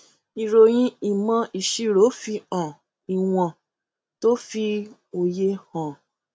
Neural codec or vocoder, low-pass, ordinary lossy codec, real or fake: none; none; none; real